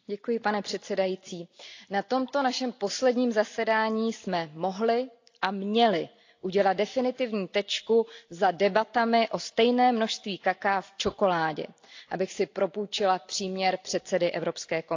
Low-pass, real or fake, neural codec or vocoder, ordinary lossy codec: 7.2 kHz; real; none; AAC, 48 kbps